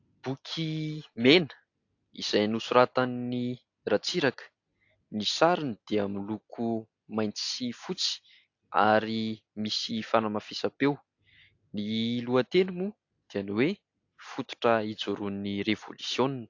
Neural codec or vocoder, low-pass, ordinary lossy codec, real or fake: none; 7.2 kHz; AAC, 48 kbps; real